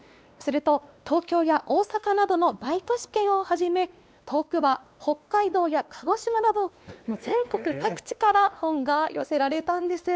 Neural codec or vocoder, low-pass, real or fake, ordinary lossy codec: codec, 16 kHz, 2 kbps, X-Codec, WavLM features, trained on Multilingual LibriSpeech; none; fake; none